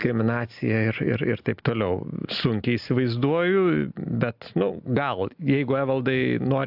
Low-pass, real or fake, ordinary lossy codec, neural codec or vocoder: 5.4 kHz; real; AAC, 48 kbps; none